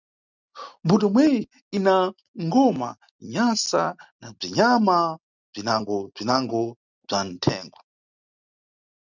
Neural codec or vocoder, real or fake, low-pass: none; real; 7.2 kHz